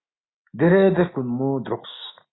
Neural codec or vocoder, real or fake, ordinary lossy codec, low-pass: autoencoder, 48 kHz, 128 numbers a frame, DAC-VAE, trained on Japanese speech; fake; AAC, 16 kbps; 7.2 kHz